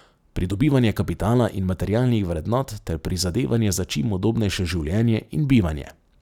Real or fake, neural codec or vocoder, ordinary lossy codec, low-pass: real; none; none; 19.8 kHz